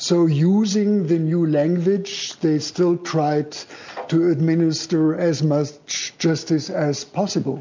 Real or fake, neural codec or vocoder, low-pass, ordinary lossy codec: real; none; 7.2 kHz; MP3, 64 kbps